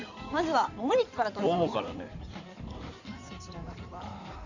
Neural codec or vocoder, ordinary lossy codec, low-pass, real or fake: codec, 16 kHz in and 24 kHz out, 2.2 kbps, FireRedTTS-2 codec; none; 7.2 kHz; fake